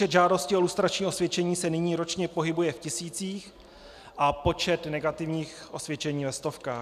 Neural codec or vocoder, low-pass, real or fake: none; 14.4 kHz; real